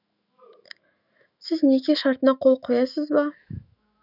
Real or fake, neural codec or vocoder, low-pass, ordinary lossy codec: fake; autoencoder, 48 kHz, 128 numbers a frame, DAC-VAE, trained on Japanese speech; 5.4 kHz; none